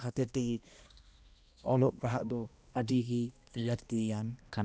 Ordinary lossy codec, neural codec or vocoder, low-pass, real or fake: none; codec, 16 kHz, 1 kbps, X-Codec, HuBERT features, trained on balanced general audio; none; fake